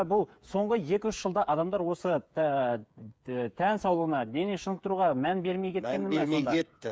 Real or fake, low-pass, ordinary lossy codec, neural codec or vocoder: fake; none; none; codec, 16 kHz, 8 kbps, FreqCodec, smaller model